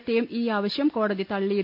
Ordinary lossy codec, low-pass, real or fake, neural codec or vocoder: none; 5.4 kHz; real; none